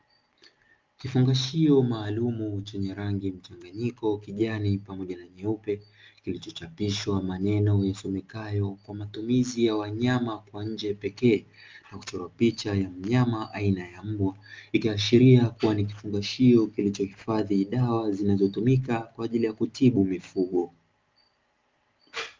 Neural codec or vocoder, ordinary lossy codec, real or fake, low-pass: none; Opus, 24 kbps; real; 7.2 kHz